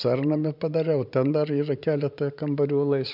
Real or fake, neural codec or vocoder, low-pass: fake; vocoder, 44.1 kHz, 128 mel bands every 512 samples, BigVGAN v2; 5.4 kHz